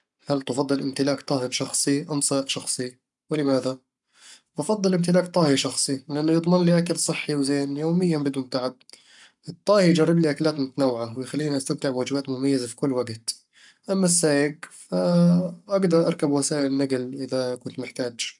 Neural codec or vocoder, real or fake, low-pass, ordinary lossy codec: codec, 44.1 kHz, 7.8 kbps, Pupu-Codec; fake; 10.8 kHz; none